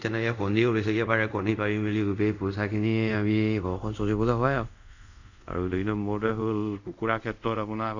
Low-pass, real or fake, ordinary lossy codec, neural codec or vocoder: 7.2 kHz; fake; none; codec, 24 kHz, 0.5 kbps, DualCodec